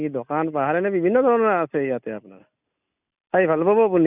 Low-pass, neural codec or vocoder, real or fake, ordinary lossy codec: 3.6 kHz; none; real; none